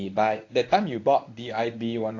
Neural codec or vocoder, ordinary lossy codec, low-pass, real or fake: codec, 24 kHz, 0.9 kbps, WavTokenizer, medium speech release version 1; AAC, 48 kbps; 7.2 kHz; fake